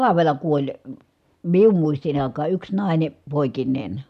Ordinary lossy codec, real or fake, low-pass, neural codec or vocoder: none; real; 14.4 kHz; none